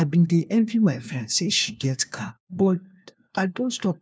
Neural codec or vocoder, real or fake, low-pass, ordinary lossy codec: codec, 16 kHz, 1 kbps, FunCodec, trained on LibriTTS, 50 frames a second; fake; none; none